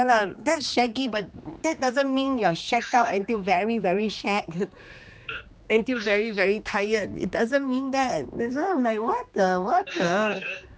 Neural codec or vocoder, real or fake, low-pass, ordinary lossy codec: codec, 16 kHz, 2 kbps, X-Codec, HuBERT features, trained on general audio; fake; none; none